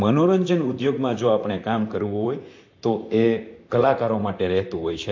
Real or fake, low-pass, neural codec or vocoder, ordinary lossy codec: fake; 7.2 kHz; vocoder, 44.1 kHz, 128 mel bands, Pupu-Vocoder; AAC, 48 kbps